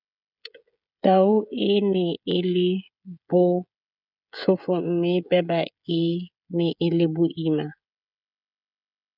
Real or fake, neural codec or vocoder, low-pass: fake; codec, 16 kHz, 16 kbps, FreqCodec, smaller model; 5.4 kHz